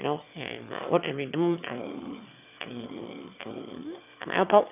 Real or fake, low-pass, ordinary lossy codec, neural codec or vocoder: fake; 3.6 kHz; none; autoencoder, 22.05 kHz, a latent of 192 numbers a frame, VITS, trained on one speaker